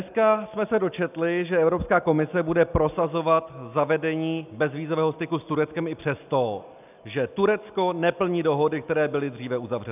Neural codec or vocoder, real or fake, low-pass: none; real; 3.6 kHz